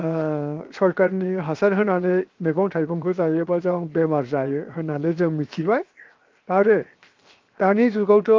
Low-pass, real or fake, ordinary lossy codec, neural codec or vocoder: 7.2 kHz; fake; Opus, 32 kbps; codec, 16 kHz, 0.7 kbps, FocalCodec